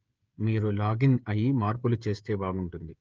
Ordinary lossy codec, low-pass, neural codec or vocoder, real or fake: Opus, 24 kbps; 7.2 kHz; codec, 16 kHz, 16 kbps, FreqCodec, smaller model; fake